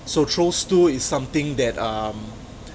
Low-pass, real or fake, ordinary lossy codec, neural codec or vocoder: none; real; none; none